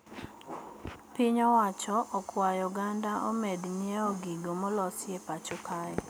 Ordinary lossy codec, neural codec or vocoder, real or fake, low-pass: none; none; real; none